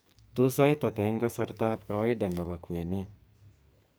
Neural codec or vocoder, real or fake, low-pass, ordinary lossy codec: codec, 44.1 kHz, 2.6 kbps, SNAC; fake; none; none